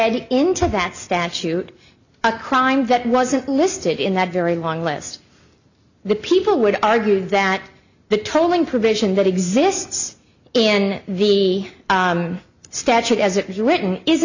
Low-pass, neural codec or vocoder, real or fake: 7.2 kHz; none; real